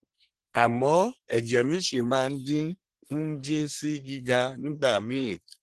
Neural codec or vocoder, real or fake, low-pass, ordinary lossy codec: codec, 24 kHz, 1 kbps, SNAC; fake; 10.8 kHz; Opus, 16 kbps